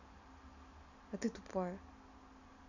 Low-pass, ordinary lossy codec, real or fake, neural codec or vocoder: 7.2 kHz; none; real; none